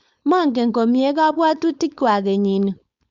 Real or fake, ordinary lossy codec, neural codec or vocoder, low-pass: fake; Opus, 64 kbps; codec, 16 kHz, 4.8 kbps, FACodec; 7.2 kHz